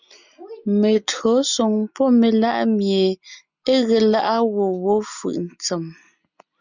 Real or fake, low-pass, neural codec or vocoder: real; 7.2 kHz; none